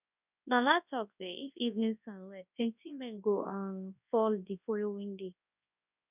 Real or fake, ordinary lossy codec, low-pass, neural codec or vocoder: fake; none; 3.6 kHz; codec, 24 kHz, 0.9 kbps, WavTokenizer, large speech release